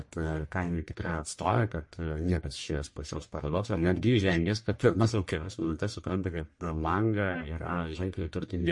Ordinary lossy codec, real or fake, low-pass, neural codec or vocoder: MP3, 48 kbps; fake; 10.8 kHz; codec, 44.1 kHz, 1.7 kbps, Pupu-Codec